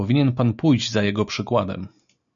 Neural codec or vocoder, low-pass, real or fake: none; 7.2 kHz; real